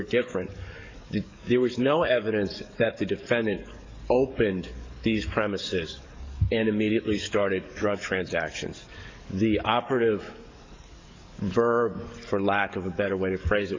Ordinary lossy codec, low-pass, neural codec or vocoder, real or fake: MP3, 48 kbps; 7.2 kHz; codec, 44.1 kHz, 7.8 kbps, DAC; fake